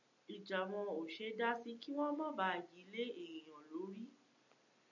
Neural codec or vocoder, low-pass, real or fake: none; 7.2 kHz; real